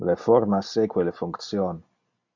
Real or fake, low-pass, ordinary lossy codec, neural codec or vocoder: real; 7.2 kHz; MP3, 64 kbps; none